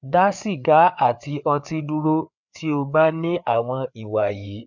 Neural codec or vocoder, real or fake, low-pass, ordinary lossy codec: codec, 16 kHz, 4 kbps, FreqCodec, larger model; fake; 7.2 kHz; none